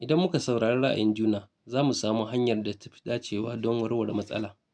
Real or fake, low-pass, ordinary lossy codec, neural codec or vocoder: real; 9.9 kHz; none; none